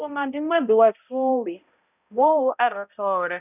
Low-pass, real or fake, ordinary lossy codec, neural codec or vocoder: 3.6 kHz; fake; none; codec, 16 kHz, 0.5 kbps, X-Codec, HuBERT features, trained on balanced general audio